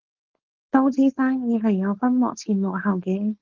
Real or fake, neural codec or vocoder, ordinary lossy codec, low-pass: fake; codec, 16 kHz, 4.8 kbps, FACodec; Opus, 16 kbps; 7.2 kHz